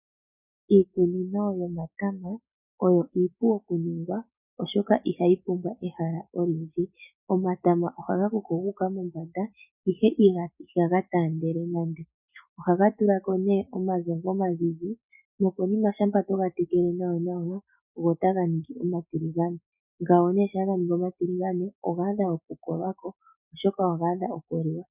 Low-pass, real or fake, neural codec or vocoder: 3.6 kHz; real; none